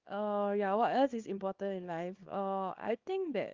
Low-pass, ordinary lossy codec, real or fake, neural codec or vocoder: 7.2 kHz; Opus, 24 kbps; fake; codec, 24 kHz, 0.9 kbps, WavTokenizer, small release